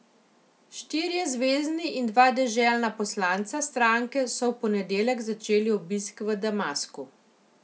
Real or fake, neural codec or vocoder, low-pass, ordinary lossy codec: real; none; none; none